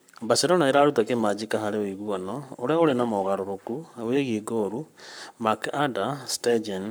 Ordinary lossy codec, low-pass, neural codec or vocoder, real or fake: none; none; codec, 44.1 kHz, 7.8 kbps, Pupu-Codec; fake